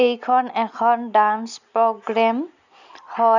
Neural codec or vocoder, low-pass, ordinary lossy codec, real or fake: none; 7.2 kHz; none; real